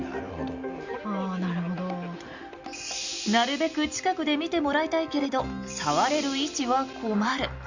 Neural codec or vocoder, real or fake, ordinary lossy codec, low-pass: none; real; Opus, 64 kbps; 7.2 kHz